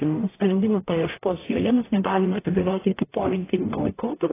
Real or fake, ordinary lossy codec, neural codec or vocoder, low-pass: fake; AAC, 16 kbps; codec, 44.1 kHz, 0.9 kbps, DAC; 3.6 kHz